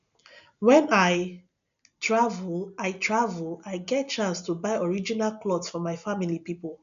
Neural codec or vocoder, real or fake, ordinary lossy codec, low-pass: none; real; none; 7.2 kHz